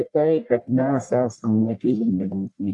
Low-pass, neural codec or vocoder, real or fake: 10.8 kHz; codec, 44.1 kHz, 1.7 kbps, Pupu-Codec; fake